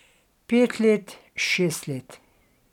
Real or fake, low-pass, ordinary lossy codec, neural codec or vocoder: real; 19.8 kHz; none; none